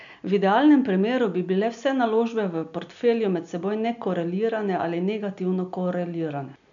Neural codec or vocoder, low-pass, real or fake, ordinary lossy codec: none; 7.2 kHz; real; none